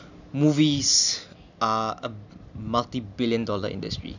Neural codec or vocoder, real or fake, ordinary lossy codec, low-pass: none; real; none; 7.2 kHz